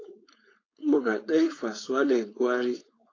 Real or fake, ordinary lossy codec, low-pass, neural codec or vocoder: fake; AAC, 32 kbps; 7.2 kHz; codec, 16 kHz, 4.8 kbps, FACodec